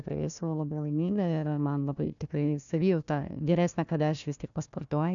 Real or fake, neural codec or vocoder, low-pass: fake; codec, 16 kHz, 1 kbps, FunCodec, trained on Chinese and English, 50 frames a second; 7.2 kHz